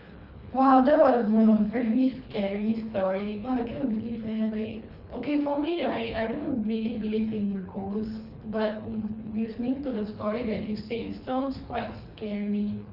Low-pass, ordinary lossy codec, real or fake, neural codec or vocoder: 5.4 kHz; none; fake; codec, 24 kHz, 3 kbps, HILCodec